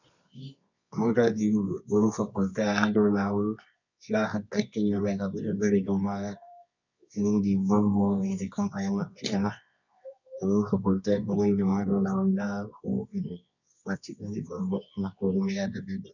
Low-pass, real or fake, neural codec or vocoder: 7.2 kHz; fake; codec, 24 kHz, 0.9 kbps, WavTokenizer, medium music audio release